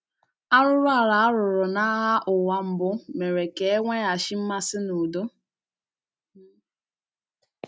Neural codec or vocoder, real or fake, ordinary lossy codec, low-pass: none; real; none; none